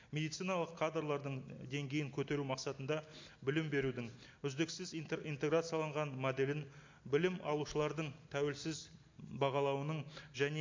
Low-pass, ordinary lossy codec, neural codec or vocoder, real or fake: 7.2 kHz; MP3, 48 kbps; none; real